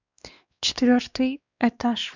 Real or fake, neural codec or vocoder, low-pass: fake; codec, 16 kHz, 2 kbps, X-Codec, WavLM features, trained on Multilingual LibriSpeech; 7.2 kHz